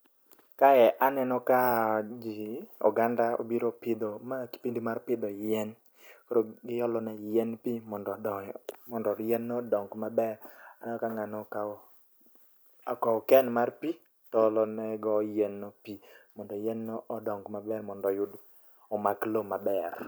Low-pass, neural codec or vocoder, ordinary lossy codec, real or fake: none; none; none; real